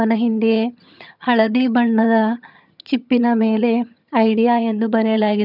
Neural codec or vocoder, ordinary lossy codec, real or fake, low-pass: vocoder, 22.05 kHz, 80 mel bands, HiFi-GAN; none; fake; 5.4 kHz